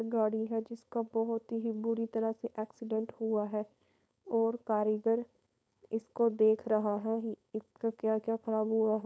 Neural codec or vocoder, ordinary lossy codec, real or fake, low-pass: codec, 16 kHz, 4.8 kbps, FACodec; none; fake; none